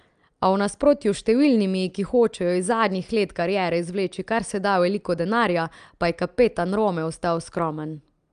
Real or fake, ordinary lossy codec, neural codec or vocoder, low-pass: real; Opus, 32 kbps; none; 9.9 kHz